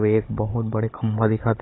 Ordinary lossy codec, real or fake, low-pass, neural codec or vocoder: AAC, 16 kbps; fake; 7.2 kHz; codec, 16 kHz, 16 kbps, FunCodec, trained on Chinese and English, 50 frames a second